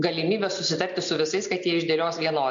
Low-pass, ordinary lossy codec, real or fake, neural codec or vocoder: 7.2 kHz; AAC, 64 kbps; real; none